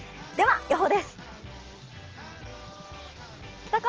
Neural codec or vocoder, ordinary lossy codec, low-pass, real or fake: none; Opus, 16 kbps; 7.2 kHz; real